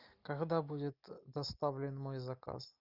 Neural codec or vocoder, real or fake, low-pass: none; real; 5.4 kHz